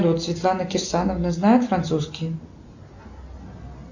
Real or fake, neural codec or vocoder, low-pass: real; none; 7.2 kHz